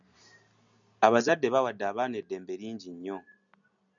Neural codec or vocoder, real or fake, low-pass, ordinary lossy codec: none; real; 7.2 kHz; MP3, 96 kbps